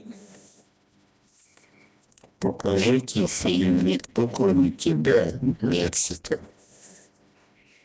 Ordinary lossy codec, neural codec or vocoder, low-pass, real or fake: none; codec, 16 kHz, 1 kbps, FreqCodec, smaller model; none; fake